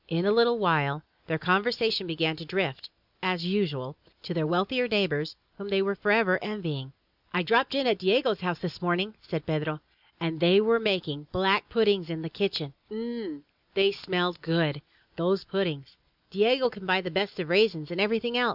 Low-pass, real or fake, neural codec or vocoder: 5.4 kHz; real; none